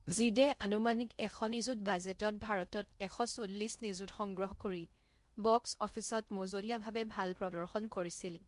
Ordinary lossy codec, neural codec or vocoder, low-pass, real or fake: MP3, 64 kbps; codec, 16 kHz in and 24 kHz out, 0.6 kbps, FocalCodec, streaming, 2048 codes; 10.8 kHz; fake